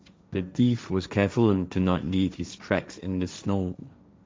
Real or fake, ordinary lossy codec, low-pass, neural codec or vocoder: fake; none; none; codec, 16 kHz, 1.1 kbps, Voila-Tokenizer